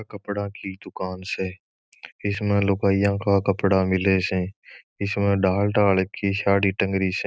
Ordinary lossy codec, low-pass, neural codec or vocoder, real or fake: none; none; none; real